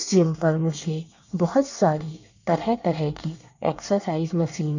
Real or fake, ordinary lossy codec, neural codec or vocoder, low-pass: fake; none; codec, 24 kHz, 1 kbps, SNAC; 7.2 kHz